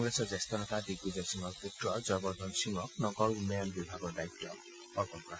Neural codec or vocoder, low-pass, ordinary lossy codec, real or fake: none; none; none; real